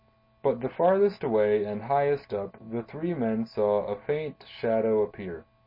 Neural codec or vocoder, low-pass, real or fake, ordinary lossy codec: none; 5.4 kHz; real; MP3, 24 kbps